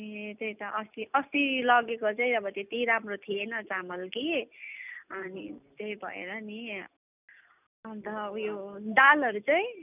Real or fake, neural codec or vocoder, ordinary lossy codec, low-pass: real; none; none; 3.6 kHz